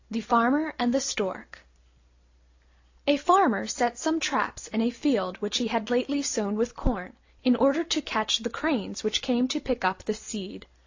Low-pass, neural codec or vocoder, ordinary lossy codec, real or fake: 7.2 kHz; none; AAC, 48 kbps; real